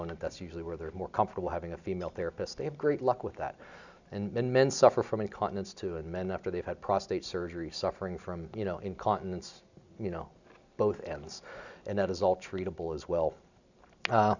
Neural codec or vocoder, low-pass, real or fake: none; 7.2 kHz; real